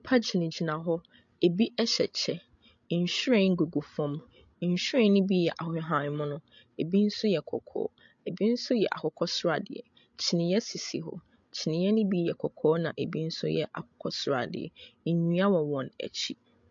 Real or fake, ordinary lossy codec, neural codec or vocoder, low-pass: fake; MP3, 48 kbps; codec, 16 kHz, 16 kbps, FreqCodec, larger model; 7.2 kHz